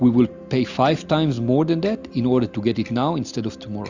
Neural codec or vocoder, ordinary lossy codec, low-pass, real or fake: none; Opus, 64 kbps; 7.2 kHz; real